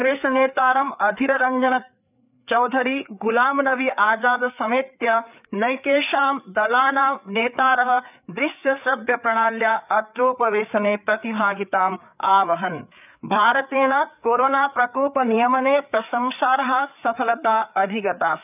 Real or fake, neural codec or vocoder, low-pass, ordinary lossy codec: fake; codec, 16 kHz, 4 kbps, FreqCodec, larger model; 3.6 kHz; none